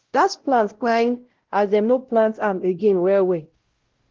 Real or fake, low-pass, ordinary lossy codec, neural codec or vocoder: fake; 7.2 kHz; Opus, 16 kbps; codec, 16 kHz, 0.5 kbps, X-Codec, WavLM features, trained on Multilingual LibriSpeech